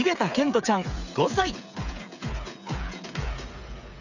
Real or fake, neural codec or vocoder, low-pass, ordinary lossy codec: fake; codec, 16 kHz, 8 kbps, FreqCodec, smaller model; 7.2 kHz; none